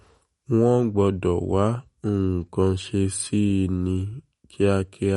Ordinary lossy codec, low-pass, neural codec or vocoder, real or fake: MP3, 48 kbps; 19.8 kHz; autoencoder, 48 kHz, 128 numbers a frame, DAC-VAE, trained on Japanese speech; fake